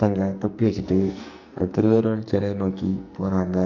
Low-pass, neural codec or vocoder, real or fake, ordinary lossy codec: 7.2 kHz; codec, 44.1 kHz, 2.6 kbps, SNAC; fake; none